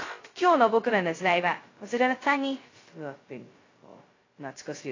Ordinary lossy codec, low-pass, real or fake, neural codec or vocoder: AAC, 32 kbps; 7.2 kHz; fake; codec, 16 kHz, 0.2 kbps, FocalCodec